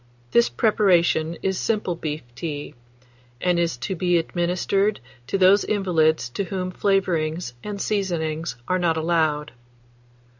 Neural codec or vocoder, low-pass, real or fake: none; 7.2 kHz; real